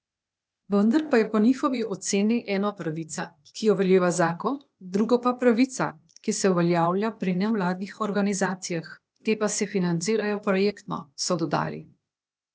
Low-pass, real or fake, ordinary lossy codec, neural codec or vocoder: none; fake; none; codec, 16 kHz, 0.8 kbps, ZipCodec